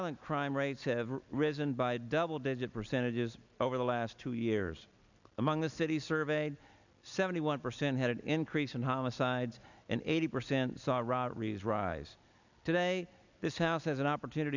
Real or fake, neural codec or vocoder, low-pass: real; none; 7.2 kHz